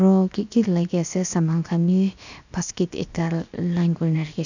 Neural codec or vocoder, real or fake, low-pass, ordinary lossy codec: codec, 16 kHz, 0.7 kbps, FocalCodec; fake; 7.2 kHz; none